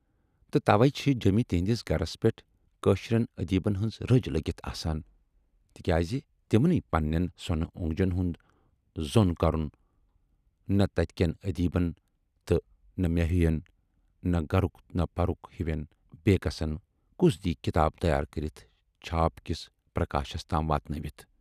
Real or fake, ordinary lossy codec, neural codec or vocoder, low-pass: real; none; none; 14.4 kHz